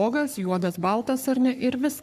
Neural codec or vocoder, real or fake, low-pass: codec, 44.1 kHz, 3.4 kbps, Pupu-Codec; fake; 14.4 kHz